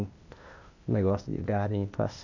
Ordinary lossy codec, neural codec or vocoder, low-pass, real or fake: none; codec, 16 kHz, 0.8 kbps, ZipCodec; 7.2 kHz; fake